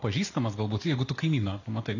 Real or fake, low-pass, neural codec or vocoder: real; 7.2 kHz; none